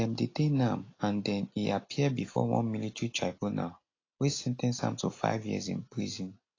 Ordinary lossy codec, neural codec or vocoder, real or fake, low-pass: AAC, 32 kbps; none; real; 7.2 kHz